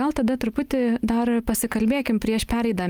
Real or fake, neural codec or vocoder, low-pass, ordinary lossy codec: real; none; 19.8 kHz; Opus, 32 kbps